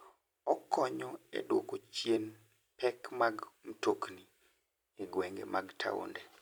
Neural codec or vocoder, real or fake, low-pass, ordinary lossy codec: vocoder, 44.1 kHz, 128 mel bands every 256 samples, BigVGAN v2; fake; none; none